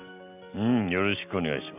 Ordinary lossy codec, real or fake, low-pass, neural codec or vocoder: none; real; 3.6 kHz; none